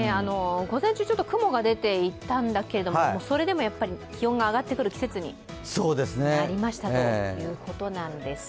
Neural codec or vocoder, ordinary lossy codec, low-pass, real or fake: none; none; none; real